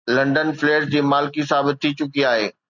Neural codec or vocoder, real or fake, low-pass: none; real; 7.2 kHz